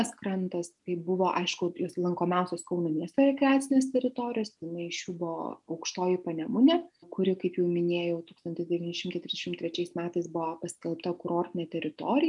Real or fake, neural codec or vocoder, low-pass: real; none; 10.8 kHz